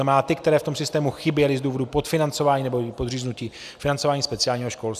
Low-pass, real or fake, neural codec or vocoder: 14.4 kHz; real; none